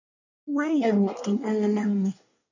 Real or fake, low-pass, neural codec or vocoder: fake; 7.2 kHz; codec, 24 kHz, 1 kbps, SNAC